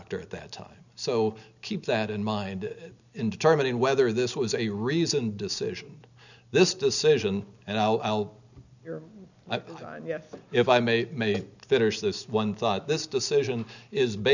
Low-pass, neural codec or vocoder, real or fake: 7.2 kHz; none; real